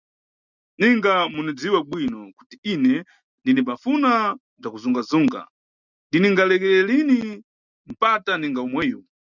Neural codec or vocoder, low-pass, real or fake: none; 7.2 kHz; real